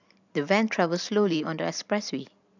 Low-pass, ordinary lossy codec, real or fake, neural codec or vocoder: 7.2 kHz; none; fake; vocoder, 44.1 kHz, 128 mel bands every 512 samples, BigVGAN v2